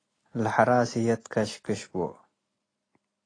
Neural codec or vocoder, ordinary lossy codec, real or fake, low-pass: none; AAC, 32 kbps; real; 9.9 kHz